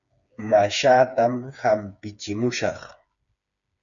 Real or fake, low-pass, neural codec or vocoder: fake; 7.2 kHz; codec, 16 kHz, 4 kbps, FreqCodec, smaller model